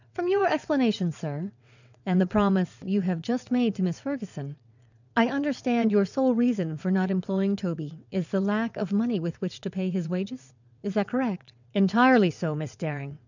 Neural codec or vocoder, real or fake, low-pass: vocoder, 22.05 kHz, 80 mel bands, WaveNeXt; fake; 7.2 kHz